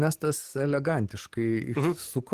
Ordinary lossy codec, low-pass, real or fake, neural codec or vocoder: Opus, 16 kbps; 14.4 kHz; fake; codec, 44.1 kHz, 7.8 kbps, DAC